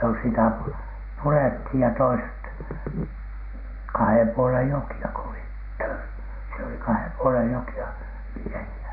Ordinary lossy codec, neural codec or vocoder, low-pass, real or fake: none; none; 5.4 kHz; real